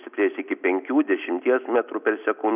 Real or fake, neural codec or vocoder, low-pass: real; none; 3.6 kHz